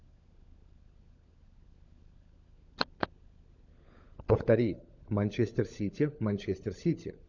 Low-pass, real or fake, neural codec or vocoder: 7.2 kHz; fake; codec, 16 kHz, 16 kbps, FunCodec, trained on LibriTTS, 50 frames a second